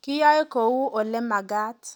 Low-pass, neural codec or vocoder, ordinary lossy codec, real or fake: 19.8 kHz; none; none; real